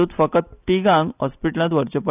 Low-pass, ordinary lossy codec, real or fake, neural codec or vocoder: 3.6 kHz; none; real; none